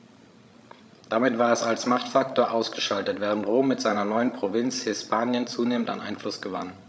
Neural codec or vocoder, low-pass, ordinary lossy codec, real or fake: codec, 16 kHz, 16 kbps, FreqCodec, larger model; none; none; fake